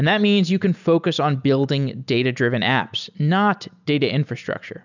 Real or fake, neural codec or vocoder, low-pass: real; none; 7.2 kHz